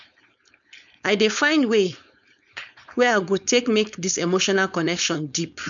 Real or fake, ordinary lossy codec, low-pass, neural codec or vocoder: fake; none; 7.2 kHz; codec, 16 kHz, 4.8 kbps, FACodec